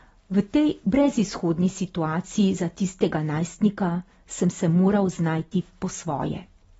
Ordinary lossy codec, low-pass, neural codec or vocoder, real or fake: AAC, 24 kbps; 19.8 kHz; none; real